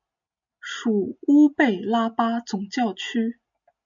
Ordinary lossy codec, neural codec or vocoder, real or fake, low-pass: MP3, 64 kbps; none; real; 7.2 kHz